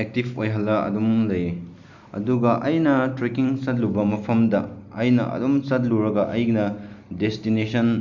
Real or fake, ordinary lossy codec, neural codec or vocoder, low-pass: real; none; none; 7.2 kHz